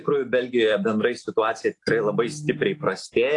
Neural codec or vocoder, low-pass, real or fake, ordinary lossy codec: none; 10.8 kHz; real; AAC, 48 kbps